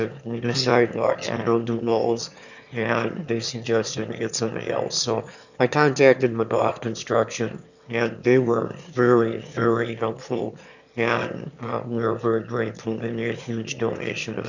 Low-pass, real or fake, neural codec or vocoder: 7.2 kHz; fake; autoencoder, 22.05 kHz, a latent of 192 numbers a frame, VITS, trained on one speaker